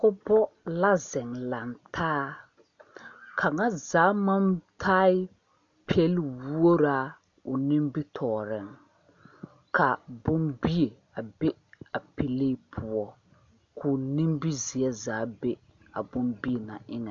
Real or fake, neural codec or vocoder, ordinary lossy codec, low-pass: real; none; AAC, 64 kbps; 7.2 kHz